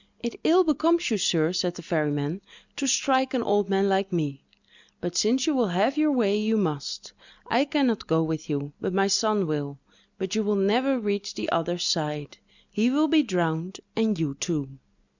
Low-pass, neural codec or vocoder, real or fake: 7.2 kHz; none; real